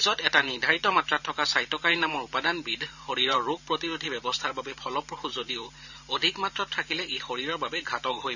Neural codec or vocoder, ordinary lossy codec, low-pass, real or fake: vocoder, 44.1 kHz, 128 mel bands every 512 samples, BigVGAN v2; none; 7.2 kHz; fake